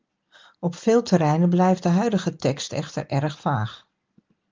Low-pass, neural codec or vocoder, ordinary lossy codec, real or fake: 7.2 kHz; none; Opus, 32 kbps; real